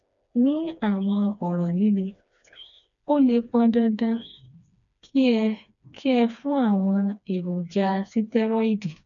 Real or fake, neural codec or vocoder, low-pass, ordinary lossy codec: fake; codec, 16 kHz, 2 kbps, FreqCodec, smaller model; 7.2 kHz; none